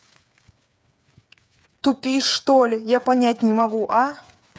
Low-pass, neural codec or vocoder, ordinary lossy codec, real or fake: none; codec, 16 kHz, 8 kbps, FreqCodec, smaller model; none; fake